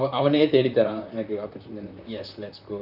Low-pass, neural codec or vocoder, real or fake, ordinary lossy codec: 5.4 kHz; vocoder, 44.1 kHz, 128 mel bands, Pupu-Vocoder; fake; none